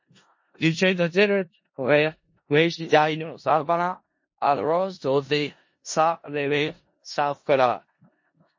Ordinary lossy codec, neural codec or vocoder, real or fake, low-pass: MP3, 32 kbps; codec, 16 kHz in and 24 kHz out, 0.4 kbps, LongCat-Audio-Codec, four codebook decoder; fake; 7.2 kHz